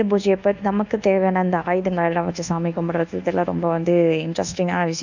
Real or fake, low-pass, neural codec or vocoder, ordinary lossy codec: fake; 7.2 kHz; codec, 24 kHz, 1.2 kbps, DualCodec; none